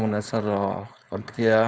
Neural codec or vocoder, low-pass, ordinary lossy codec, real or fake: codec, 16 kHz, 4.8 kbps, FACodec; none; none; fake